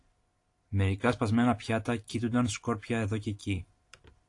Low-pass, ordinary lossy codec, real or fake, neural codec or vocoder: 10.8 kHz; AAC, 48 kbps; real; none